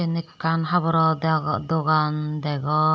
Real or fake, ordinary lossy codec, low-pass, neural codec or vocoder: real; none; none; none